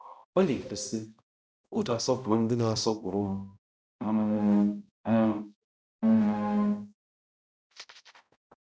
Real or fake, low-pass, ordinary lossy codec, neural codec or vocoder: fake; none; none; codec, 16 kHz, 0.5 kbps, X-Codec, HuBERT features, trained on balanced general audio